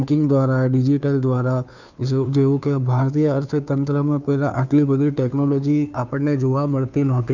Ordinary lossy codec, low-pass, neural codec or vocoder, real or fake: none; 7.2 kHz; codec, 16 kHz, 2 kbps, FunCodec, trained on Chinese and English, 25 frames a second; fake